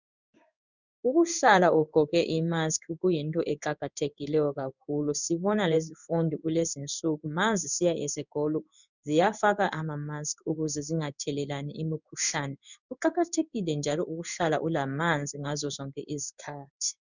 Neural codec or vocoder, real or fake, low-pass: codec, 16 kHz in and 24 kHz out, 1 kbps, XY-Tokenizer; fake; 7.2 kHz